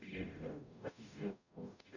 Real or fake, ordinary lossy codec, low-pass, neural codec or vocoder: fake; none; 7.2 kHz; codec, 44.1 kHz, 0.9 kbps, DAC